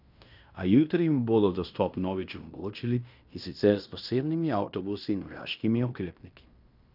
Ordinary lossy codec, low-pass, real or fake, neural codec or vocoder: none; 5.4 kHz; fake; codec, 16 kHz in and 24 kHz out, 0.9 kbps, LongCat-Audio-Codec, fine tuned four codebook decoder